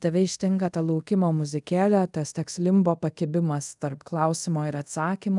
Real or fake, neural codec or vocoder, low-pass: fake; codec, 24 kHz, 0.5 kbps, DualCodec; 10.8 kHz